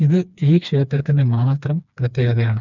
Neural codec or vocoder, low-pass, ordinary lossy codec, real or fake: codec, 16 kHz, 2 kbps, FreqCodec, smaller model; 7.2 kHz; none; fake